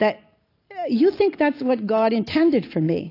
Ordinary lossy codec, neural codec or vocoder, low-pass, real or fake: AAC, 32 kbps; none; 5.4 kHz; real